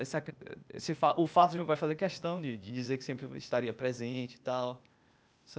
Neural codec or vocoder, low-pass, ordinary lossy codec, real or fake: codec, 16 kHz, 0.8 kbps, ZipCodec; none; none; fake